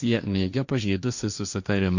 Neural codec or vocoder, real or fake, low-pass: codec, 16 kHz, 1.1 kbps, Voila-Tokenizer; fake; 7.2 kHz